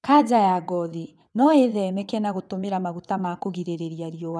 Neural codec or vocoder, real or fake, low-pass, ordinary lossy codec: vocoder, 22.05 kHz, 80 mel bands, WaveNeXt; fake; none; none